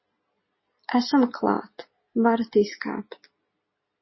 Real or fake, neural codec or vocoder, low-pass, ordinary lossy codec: real; none; 7.2 kHz; MP3, 24 kbps